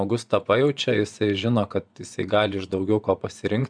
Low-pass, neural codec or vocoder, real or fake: 9.9 kHz; vocoder, 24 kHz, 100 mel bands, Vocos; fake